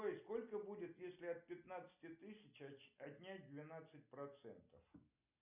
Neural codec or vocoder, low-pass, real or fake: none; 3.6 kHz; real